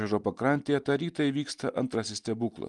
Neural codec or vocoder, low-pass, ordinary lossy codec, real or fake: none; 10.8 kHz; Opus, 32 kbps; real